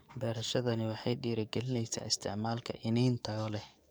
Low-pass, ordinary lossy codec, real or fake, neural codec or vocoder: none; none; fake; codec, 44.1 kHz, 7.8 kbps, DAC